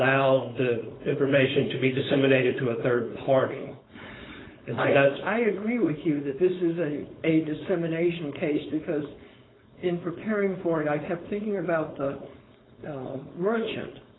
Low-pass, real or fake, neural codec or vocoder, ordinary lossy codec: 7.2 kHz; fake; codec, 16 kHz, 4.8 kbps, FACodec; AAC, 16 kbps